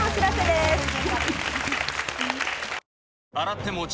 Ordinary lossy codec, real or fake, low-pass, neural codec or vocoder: none; real; none; none